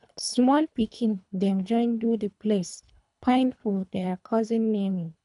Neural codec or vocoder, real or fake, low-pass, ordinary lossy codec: codec, 24 kHz, 3 kbps, HILCodec; fake; 10.8 kHz; none